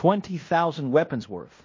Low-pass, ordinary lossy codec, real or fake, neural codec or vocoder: 7.2 kHz; MP3, 32 kbps; fake; codec, 16 kHz in and 24 kHz out, 0.9 kbps, LongCat-Audio-Codec, fine tuned four codebook decoder